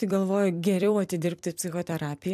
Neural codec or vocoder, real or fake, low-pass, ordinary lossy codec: vocoder, 44.1 kHz, 128 mel bands every 256 samples, BigVGAN v2; fake; 14.4 kHz; AAC, 96 kbps